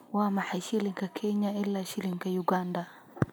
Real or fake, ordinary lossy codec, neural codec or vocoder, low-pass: real; none; none; none